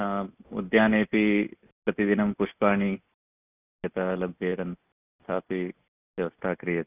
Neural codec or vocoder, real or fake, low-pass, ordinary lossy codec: none; real; 3.6 kHz; none